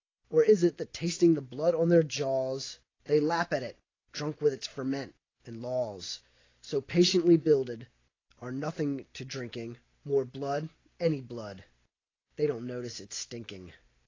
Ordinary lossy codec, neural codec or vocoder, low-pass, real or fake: AAC, 32 kbps; none; 7.2 kHz; real